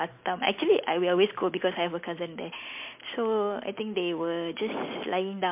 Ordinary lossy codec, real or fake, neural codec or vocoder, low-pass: MP3, 32 kbps; real; none; 3.6 kHz